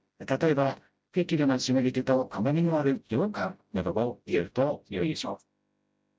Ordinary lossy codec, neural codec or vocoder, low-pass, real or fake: none; codec, 16 kHz, 0.5 kbps, FreqCodec, smaller model; none; fake